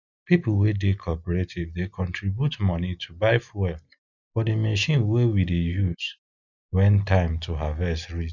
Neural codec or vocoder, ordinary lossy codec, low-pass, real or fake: none; none; 7.2 kHz; real